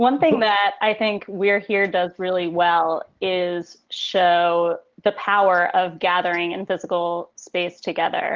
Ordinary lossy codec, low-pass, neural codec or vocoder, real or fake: Opus, 16 kbps; 7.2 kHz; none; real